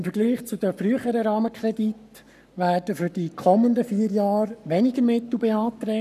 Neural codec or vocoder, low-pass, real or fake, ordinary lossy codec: codec, 44.1 kHz, 7.8 kbps, Pupu-Codec; 14.4 kHz; fake; none